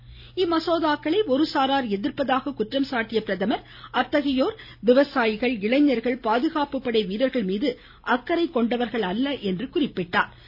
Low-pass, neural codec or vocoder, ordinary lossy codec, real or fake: 5.4 kHz; none; none; real